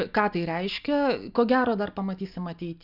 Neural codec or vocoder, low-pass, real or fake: none; 5.4 kHz; real